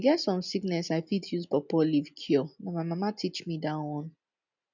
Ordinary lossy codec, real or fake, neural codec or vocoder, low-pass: none; real; none; 7.2 kHz